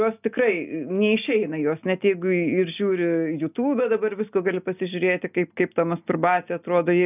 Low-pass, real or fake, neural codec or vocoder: 3.6 kHz; real; none